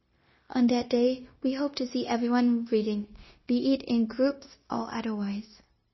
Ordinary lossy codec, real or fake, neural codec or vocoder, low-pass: MP3, 24 kbps; fake; codec, 16 kHz, 0.9 kbps, LongCat-Audio-Codec; 7.2 kHz